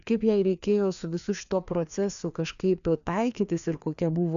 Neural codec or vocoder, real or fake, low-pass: codec, 16 kHz, 2 kbps, FreqCodec, larger model; fake; 7.2 kHz